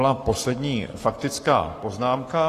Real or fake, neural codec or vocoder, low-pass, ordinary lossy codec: fake; codec, 44.1 kHz, 7.8 kbps, Pupu-Codec; 14.4 kHz; AAC, 48 kbps